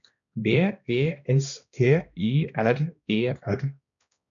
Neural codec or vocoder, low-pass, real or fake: codec, 16 kHz, 1 kbps, X-Codec, HuBERT features, trained on balanced general audio; 7.2 kHz; fake